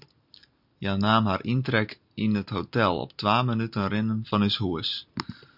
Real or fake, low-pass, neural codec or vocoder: fake; 5.4 kHz; vocoder, 44.1 kHz, 128 mel bands every 256 samples, BigVGAN v2